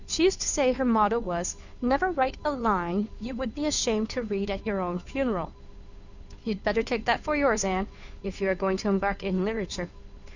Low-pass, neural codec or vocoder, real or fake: 7.2 kHz; codec, 16 kHz, 2 kbps, FunCodec, trained on Chinese and English, 25 frames a second; fake